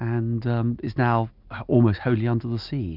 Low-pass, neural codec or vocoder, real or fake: 5.4 kHz; none; real